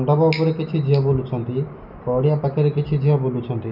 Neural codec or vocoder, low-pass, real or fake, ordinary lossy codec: none; 5.4 kHz; real; none